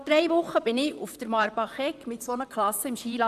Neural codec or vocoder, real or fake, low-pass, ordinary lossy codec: vocoder, 44.1 kHz, 128 mel bands, Pupu-Vocoder; fake; 14.4 kHz; none